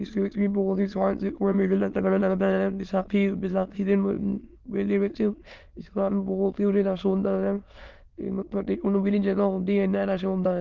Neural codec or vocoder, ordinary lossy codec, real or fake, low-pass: autoencoder, 22.05 kHz, a latent of 192 numbers a frame, VITS, trained on many speakers; Opus, 32 kbps; fake; 7.2 kHz